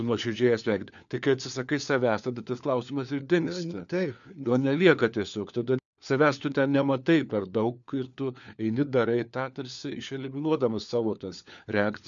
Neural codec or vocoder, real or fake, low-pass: codec, 16 kHz, 4 kbps, FunCodec, trained on LibriTTS, 50 frames a second; fake; 7.2 kHz